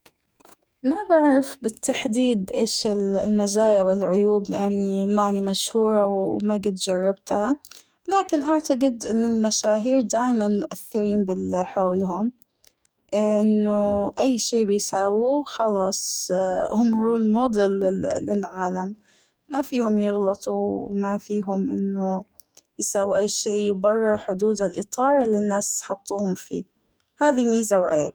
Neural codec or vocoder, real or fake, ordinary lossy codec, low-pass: codec, 44.1 kHz, 2.6 kbps, DAC; fake; none; none